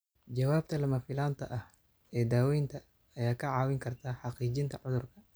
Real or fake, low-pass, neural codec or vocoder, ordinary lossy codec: real; none; none; none